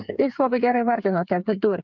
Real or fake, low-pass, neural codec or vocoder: fake; 7.2 kHz; codec, 16 kHz, 8 kbps, FreqCodec, smaller model